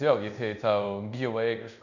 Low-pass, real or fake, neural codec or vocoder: 7.2 kHz; fake; codec, 24 kHz, 0.5 kbps, DualCodec